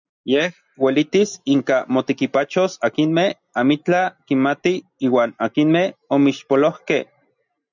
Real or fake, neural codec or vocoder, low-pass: real; none; 7.2 kHz